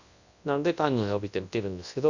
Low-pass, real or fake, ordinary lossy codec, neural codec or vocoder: 7.2 kHz; fake; none; codec, 24 kHz, 0.9 kbps, WavTokenizer, large speech release